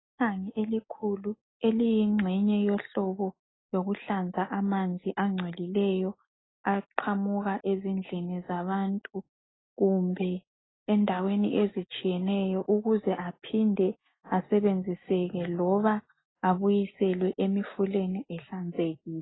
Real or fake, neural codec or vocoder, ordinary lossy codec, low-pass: real; none; AAC, 16 kbps; 7.2 kHz